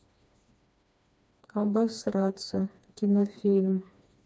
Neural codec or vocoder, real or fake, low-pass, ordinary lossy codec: codec, 16 kHz, 2 kbps, FreqCodec, smaller model; fake; none; none